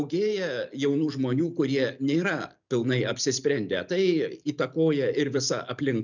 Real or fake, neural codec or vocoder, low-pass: real; none; 7.2 kHz